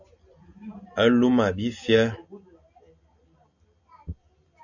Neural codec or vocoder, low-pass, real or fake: none; 7.2 kHz; real